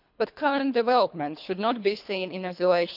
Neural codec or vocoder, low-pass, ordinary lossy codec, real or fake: codec, 24 kHz, 3 kbps, HILCodec; 5.4 kHz; none; fake